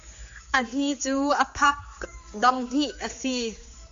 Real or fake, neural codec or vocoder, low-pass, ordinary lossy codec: fake; codec, 16 kHz, 4 kbps, X-Codec, HuBERT features, trained on general audio; 7.2 kHz; MP3, 48 kbps